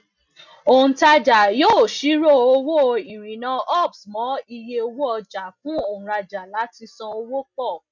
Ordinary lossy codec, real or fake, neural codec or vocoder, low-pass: none; real; none; 7.2 kHz